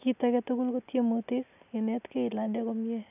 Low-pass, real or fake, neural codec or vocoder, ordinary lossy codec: 3.6 kHz; real; none; none